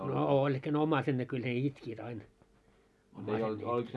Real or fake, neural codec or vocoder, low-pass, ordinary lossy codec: real; none; none; none